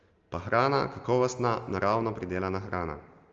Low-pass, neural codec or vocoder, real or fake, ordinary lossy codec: 7.2 kHz; none; real; Opus, 32 kbps